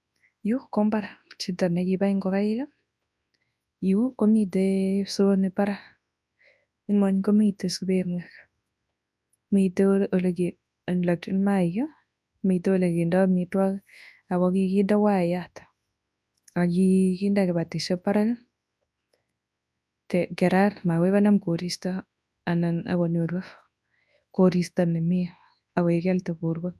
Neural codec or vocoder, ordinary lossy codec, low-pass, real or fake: codec, 24 kHz, 0.9 kbps, WavTokenizer, large speech release; none; none; fake